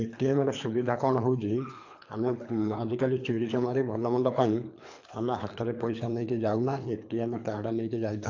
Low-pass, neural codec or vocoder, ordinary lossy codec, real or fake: 7.2 kHz; codec, 24 kHz, 3 kbps, HILCodec; AAC, 48 kbps; fake